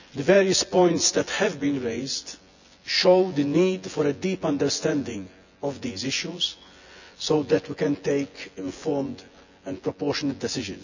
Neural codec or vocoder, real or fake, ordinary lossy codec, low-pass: vocoder, 24 kHz, 100 mel bands, Vocos; fake; none; 7.2 kHz